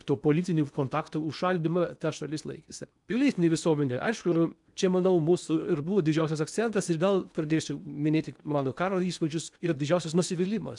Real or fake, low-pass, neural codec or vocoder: fake; 10.8 kHz; codec, 16 kHz in and 24 kHz out, 0.8 kbps, FocalCodec, streaming, 65536 codes